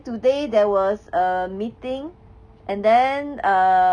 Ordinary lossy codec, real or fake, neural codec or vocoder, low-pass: none; real; none; 9.9 kHz